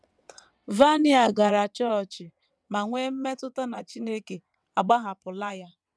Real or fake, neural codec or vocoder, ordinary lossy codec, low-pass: fake; vocoder, 22.05 kHz, 80 mel bands, WaveNeXt; none; none